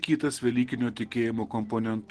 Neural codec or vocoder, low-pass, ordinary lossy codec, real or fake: none; 10.8 kHz; Opus, 16 kbps; real